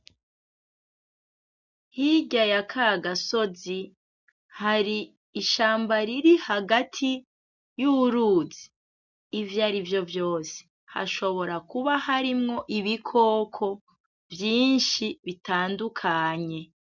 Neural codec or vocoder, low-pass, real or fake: none; 7.2 kHz; real